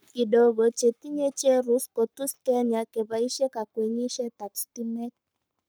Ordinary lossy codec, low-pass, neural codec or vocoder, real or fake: none; none; codec, 44.1 kHz, 7.8 kbps, Pupu-Codec; fake